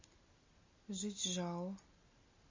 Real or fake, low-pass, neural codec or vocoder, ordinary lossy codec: real; 7.2 kHz; none; MP3, 32 kbps